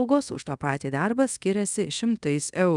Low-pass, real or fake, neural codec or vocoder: 10.8 kHz; fake; codec, 24 kHz, 0.5 kbps, DualCodec